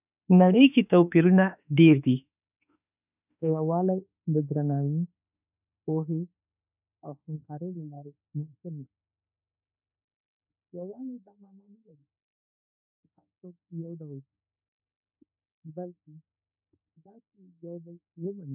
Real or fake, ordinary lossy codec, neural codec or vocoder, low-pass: fake; none; autoencoder, 48 kHz, 32 numbers a frame, DAC-VAE, trained on Japanese speech; 3.6 kHz